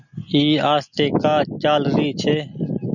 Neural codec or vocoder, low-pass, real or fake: none; 7.2 kHz; real